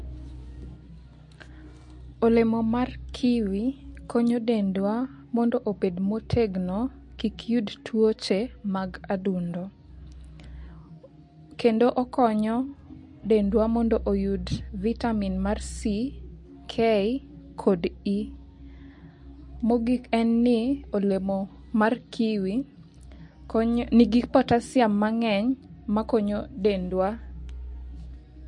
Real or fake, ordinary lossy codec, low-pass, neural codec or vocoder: real; MP3, 48 kbps; 10.8 kHz; none